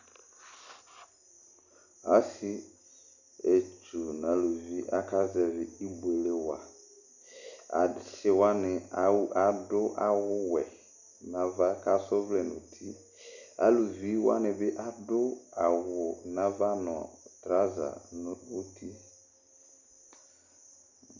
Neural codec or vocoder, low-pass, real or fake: none; 7.2 kHz; real